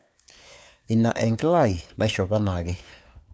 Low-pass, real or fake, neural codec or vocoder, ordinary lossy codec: none; fake; codec, 16 kHz, 4 kbps, FunCodec, trained on LibriTTS, 50 frames a second; none